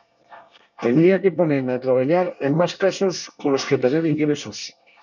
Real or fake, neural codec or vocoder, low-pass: fake; codec, 24 kHz, 1 kbps, SNAC; 7.2 kHz